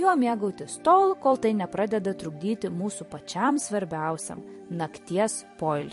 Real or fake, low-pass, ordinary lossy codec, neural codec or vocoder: fake; 14.4 kHz; MP3, 48 kbps; vocoder, 44.1 kHz, 128 mel bands every 256 samples, BigVGAN v2